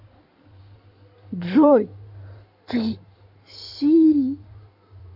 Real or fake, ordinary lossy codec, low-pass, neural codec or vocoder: fake; none; 5.4 kHz; codec, 16 kHz in and 24 kHz out, 2.2 kbps, FireRedTTS-2 codec